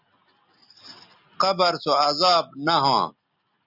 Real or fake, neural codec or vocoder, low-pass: real; none; 5.4 kHz